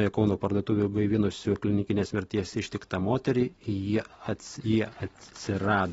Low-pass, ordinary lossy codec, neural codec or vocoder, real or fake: 19.8 kHz; AAC, 24 kbps; none; real